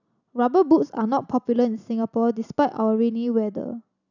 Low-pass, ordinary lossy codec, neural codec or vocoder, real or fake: 7.2 kHz; none; none; real